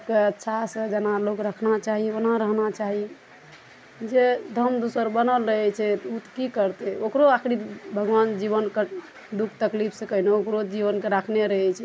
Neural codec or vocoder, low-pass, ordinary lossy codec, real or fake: none; none; none; real